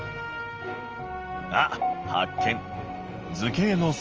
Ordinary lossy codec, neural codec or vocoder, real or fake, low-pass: Opus, 24 kbps; none; real; 7.2 kHz